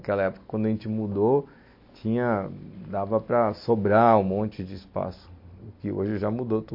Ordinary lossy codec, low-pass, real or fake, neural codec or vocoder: MP3, 32 kbps; 5.4 kHz; real; none